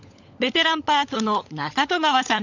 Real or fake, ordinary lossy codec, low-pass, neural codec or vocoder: fake; none; 7.2 kHz; codec, 16 kHz, 16 kbps, FunCodec, trained on LibriTTS, 50 frames a second